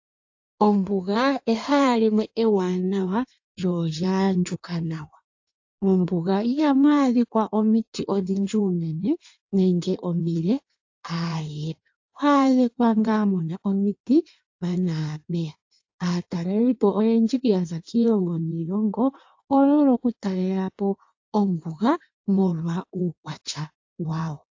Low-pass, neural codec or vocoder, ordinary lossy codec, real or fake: 7.2 kHz; codec, 16 kHz in and 24 kHz out, 1.1 kbps, FireRedTTS-2 codec; AAC, 48 kbps; fake